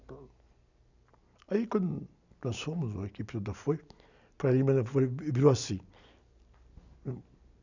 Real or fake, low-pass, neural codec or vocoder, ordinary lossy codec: real; 7.2 kHz; none; none